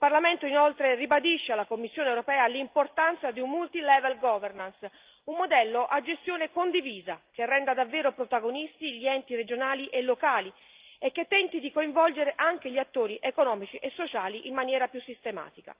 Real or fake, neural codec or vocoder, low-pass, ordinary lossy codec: real; none; 3.6 kHz; Opus, 32 kbps